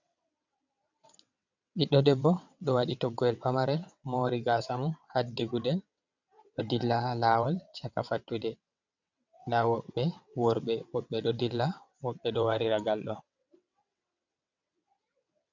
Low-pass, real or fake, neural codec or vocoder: 7.2 kHz; fake; vocoder, 22.05 kHz, 80 mel bands, WaveNeXt